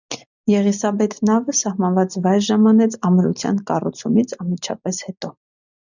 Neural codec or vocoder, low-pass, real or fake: none; 7.2 kHz; real